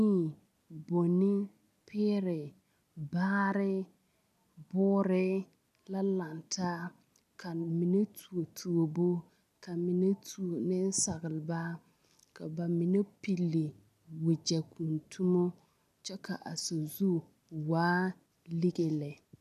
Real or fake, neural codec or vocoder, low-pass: real; none; 14.4 kHz